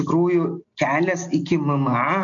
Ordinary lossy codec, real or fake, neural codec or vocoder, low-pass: MP3, 64 kbps; real; none; 7.2 kHz